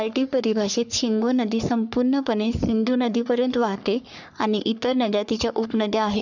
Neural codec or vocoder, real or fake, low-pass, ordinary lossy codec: codec, 44.1 kHz, 3.4 kbps, Pupu-Codec; fake; 7.2 kHz; none